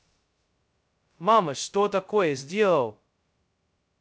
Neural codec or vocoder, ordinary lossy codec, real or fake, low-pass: codec, 16 kHz, 0.2 kbps, FocalCodec; none; fake; none